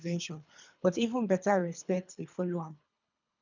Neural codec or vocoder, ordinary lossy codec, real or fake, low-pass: codec, 24 kHz, 3 kbps, HILCodec; none; fake; 7.2 kHz